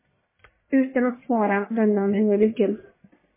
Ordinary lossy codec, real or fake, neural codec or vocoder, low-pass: MP3, 16 kbps; fake; codec, 44.1 kHz, 1.7 kbps, Pupu-Codec; 3.6 kHz